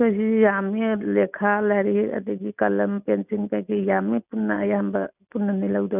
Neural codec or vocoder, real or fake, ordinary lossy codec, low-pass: none; real; none; 3.6 kHz